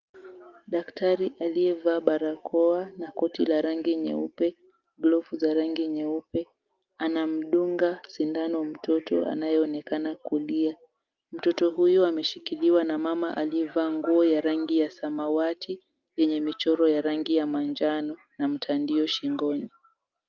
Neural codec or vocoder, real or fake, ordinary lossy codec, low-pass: none; real; Opus, 24 kbps; 7.2 kHz